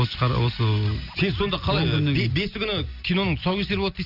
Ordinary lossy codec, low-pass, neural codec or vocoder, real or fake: none; 5.4 kHz; none; real